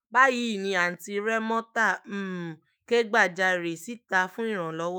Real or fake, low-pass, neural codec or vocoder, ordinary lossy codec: fake; none; autoencoder, 48 kHz, 128 numbers a frame, DAC-VAE, trained on Japanese speech; none